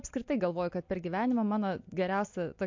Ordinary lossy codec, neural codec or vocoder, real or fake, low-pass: MP3, 48 kbps; none; real; 7.2 kHz